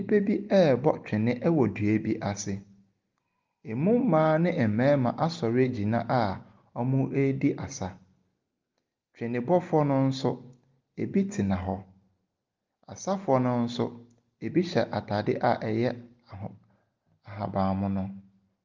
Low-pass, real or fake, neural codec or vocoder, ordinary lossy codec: 7.2 kHz; real; none; Opus, 32 kbps